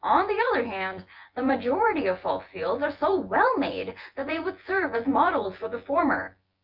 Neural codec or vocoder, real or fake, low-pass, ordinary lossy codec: vocoder, 24 kHz, 100 mel bands, Vocos; fake; 5.4 kHz; Opus, 32 kbps